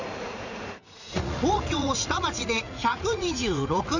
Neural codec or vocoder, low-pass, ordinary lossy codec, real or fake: vocoder, 44.1 kHz, 80 mel bands, Vocos; 7.2 kHz; none; fake